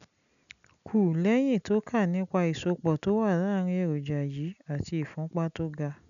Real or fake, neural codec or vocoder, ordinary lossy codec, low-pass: real; none; none; 7.2 kHz